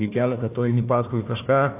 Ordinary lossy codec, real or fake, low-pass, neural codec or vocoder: AAC, 32 kbps; fake; 3.6 kHz; codec, 16 kHz, 1 kbps, FunCodec, trained on Chinese and English, 50 frames a second